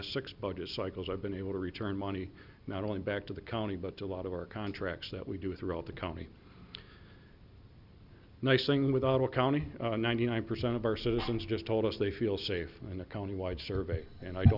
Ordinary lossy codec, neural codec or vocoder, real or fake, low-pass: Opus, 64 kbps; none; real; 5.4 kHz